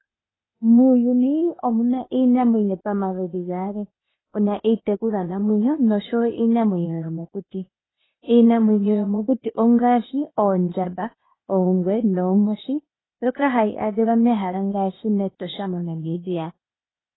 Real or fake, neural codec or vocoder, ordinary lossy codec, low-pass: fake; codec, 16 kHz, 0.8 kbps, ZipCodec; AAC, 16 kbps; 7.2 kHz